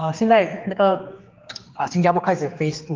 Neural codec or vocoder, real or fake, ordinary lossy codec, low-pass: codec, 16 kHz, 2 kbps, X-Codec, HuBERT features, trained on general audio; fake; Opus, 24 kbps; 7.2 kHz